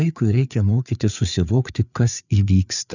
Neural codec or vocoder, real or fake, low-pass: codec, 16 kHz, 4 kbps, FreqCodec, larger model; fake; 7.2 kHz